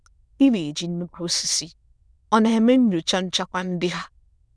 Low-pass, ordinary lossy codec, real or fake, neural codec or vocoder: none; none; fake; autoencoder, 22.05 kHz, a latent of 192 numbers a frame, VITS, trained on many speakers